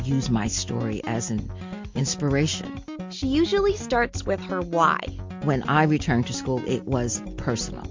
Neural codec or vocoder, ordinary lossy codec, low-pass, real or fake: none; MP3, 48 kbps; 7.2 kHz; real